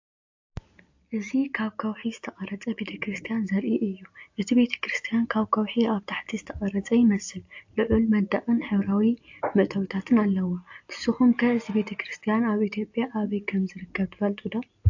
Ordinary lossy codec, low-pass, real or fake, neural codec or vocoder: AAC, 48 kbps; 7.2 kHz; real; none